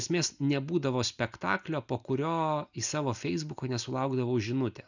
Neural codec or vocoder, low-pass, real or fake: none; 7.2 kHz; real